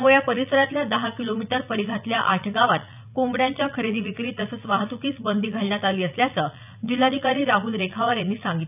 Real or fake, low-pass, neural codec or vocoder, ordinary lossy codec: fake; 3.6 kHz; vocoder, 44.1 kHz, 80 mel bands, Vocos; none